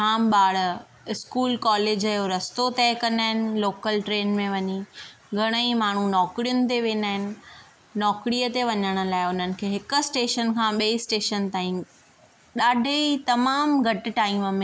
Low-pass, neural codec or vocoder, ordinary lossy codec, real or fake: none; none; none; real